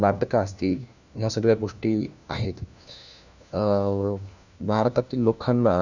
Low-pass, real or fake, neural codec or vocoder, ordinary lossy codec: 7.2 kHz; fake; codec, 16 kHz, 1 kbps, FunCodec, trained on LibriTTS, 50 frames a second; none